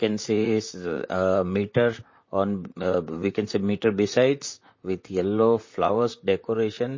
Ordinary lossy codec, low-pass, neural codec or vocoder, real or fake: MP3, 32 kbps; 7.2 kHz; vocoder, 44.1 kHz, 128 mel bands, Pupu-Vocoder; fake